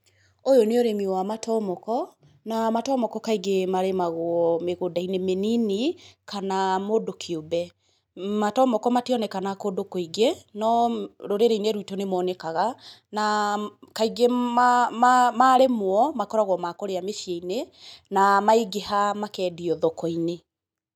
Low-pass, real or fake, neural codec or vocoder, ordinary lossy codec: 19.8 kHz; real; none; none